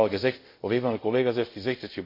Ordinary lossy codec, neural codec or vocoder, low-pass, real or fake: MP3, 32 kbps; codec, 24 kHz, 0.5 kbps, DualCodec; 5.4 kHz; fake